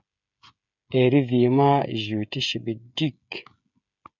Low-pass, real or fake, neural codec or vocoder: 7.2 kHz; fake; codec, 16 kHz, 16 kbps, FreqCodec, smaller model